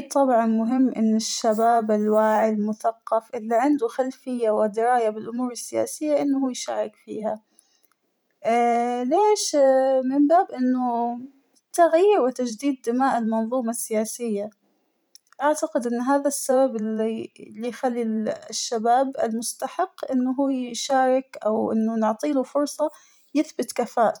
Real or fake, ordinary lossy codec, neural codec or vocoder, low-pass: fake; none; vocoder, 44.1 kHz, 128 mel bands every 512 samples, BigVGAN v2; none